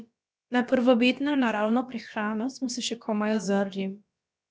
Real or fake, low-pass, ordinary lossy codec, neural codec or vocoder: fake; none; none; codec, 16 kHz, about 1 kbps, DyCAST, with the encoder's durations